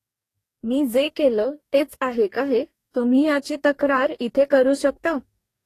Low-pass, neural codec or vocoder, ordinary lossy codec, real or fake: 14.4 kHz; codec, 44.1 kHz, 2.6 kbps, DAC; AAC, 48 kbps; fake